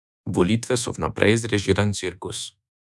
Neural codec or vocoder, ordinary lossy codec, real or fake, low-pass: codec, 24 kHz, 1.2 kbps, DualCodec; none; fake; none